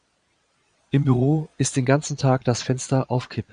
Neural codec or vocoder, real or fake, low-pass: vocoder, 22.05 kHz, 80 mel bands, Vocos; fake; 9.9 kHz